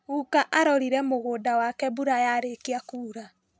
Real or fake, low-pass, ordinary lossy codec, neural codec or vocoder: real; none; none; none